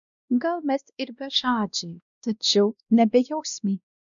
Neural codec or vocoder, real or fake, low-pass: codec, 16 kHz, 1 kbps, X-Codec, WavLM features, trained on Multilingual LibriSpeech; fake; 7.2 kHz